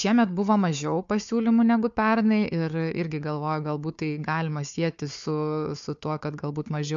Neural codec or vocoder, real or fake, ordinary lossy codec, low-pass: codec, 16 kHz, 4 kbps, FunCodec, trained on Chinese and English, 50 frames a second; fake; MP3, 64 kbps; 7.2 kHz